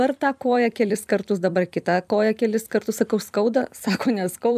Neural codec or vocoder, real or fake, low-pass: vocoder, 44.1 kHz, 128 mel bands every 256 samples, BigVGAN v2; fake; 14.4 kHz